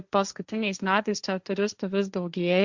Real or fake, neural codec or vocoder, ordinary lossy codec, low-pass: fake; codec, 16 kHz, 1.1 kbps, Voila-Tokenizer; Opus, 64 kbps; 7.2 kHz